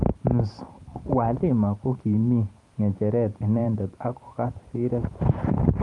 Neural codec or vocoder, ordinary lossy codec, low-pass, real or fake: vocoder, 44.1 kHz, 128 mel bands every 512 samples, BigVGAN v2; Opus, 32 kbps; 10.8 kHz; fake